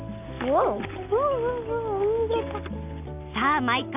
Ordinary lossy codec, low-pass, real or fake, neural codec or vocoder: none; 3.6 kHz; real; none